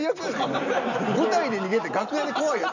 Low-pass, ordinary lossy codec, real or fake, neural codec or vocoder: 7.2 kHz; none; real; none